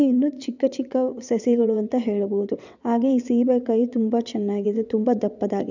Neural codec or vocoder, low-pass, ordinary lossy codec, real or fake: vocoder, 22.05 kHz, 80 mel bands, WaveNeXt; 7.2 kHz; none; fake